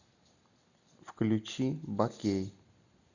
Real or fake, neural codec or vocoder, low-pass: real; none; 7.2 kHz